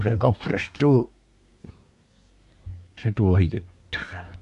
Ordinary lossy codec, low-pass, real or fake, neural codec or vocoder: none; 10.8 kHz; fake; codec, 24 kHz, 1 kbps, SNAC